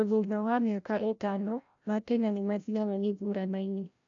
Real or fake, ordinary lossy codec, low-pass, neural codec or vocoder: fake; none; 7.2 kHz; codec, 16 kHz, 0.5 kbps, FreqCodec, larger model